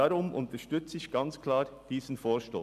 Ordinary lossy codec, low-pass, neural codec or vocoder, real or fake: none; 14.4 kHz; none; real